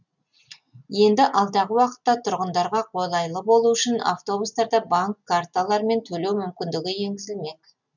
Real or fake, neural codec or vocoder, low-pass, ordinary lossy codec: real; none; 7.2 kHz; none